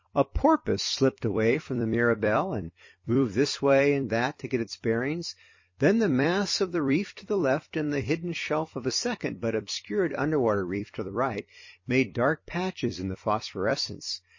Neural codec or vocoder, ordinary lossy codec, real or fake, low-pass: vocoder, 44.1 kHz, 128 mel bands every 256 samples, BigVGAN v2; MP3, 32 kbps; fake; 7.2 kHz